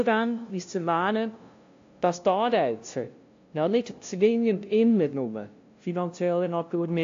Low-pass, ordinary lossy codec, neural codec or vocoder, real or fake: 7.2 kHz; AAC, 48 kbps; codec, 16 kHz, 0.5 kbps, FunCodec, trained on LibriTTS, 25 frames a second; fake